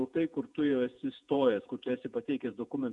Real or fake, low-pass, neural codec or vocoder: fake; 10.8 kHz; vocoder, 48 kHz, 128 mel bands, Vocos